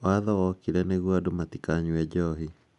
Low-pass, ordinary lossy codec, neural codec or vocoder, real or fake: 10.8 kHz; none; none; real